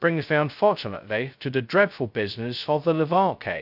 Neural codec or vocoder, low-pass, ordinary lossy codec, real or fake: codec, 16 kHz, 0.2 kbps, FocalCodec; 5.4 kHz; none; fake